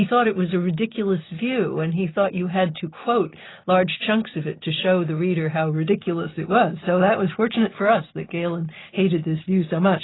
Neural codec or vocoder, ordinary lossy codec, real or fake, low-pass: none; AAC, 16 kbps; real; 7.2 kHz